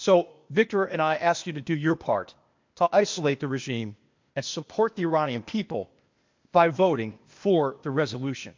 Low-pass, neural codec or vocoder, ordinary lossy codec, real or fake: 7.2 kHz; codec, 16 kHz, 0.8 kbps, ZipCodec; MP3, 48 kbps; fake